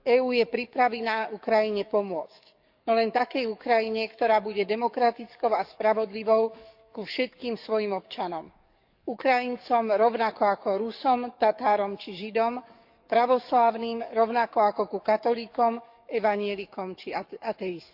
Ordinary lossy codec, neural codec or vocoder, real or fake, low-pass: none; codec, 44.1 kHz, 7.8 kbps, DAC; fake; 5.4 kHz